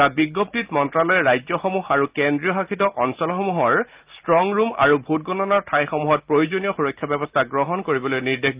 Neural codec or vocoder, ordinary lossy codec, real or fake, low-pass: none; Opus, 32 kbps; real; 3.6 kHz